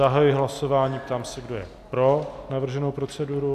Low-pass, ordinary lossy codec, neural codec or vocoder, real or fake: 14.4 kHz; AAC, 96 kbps; none; real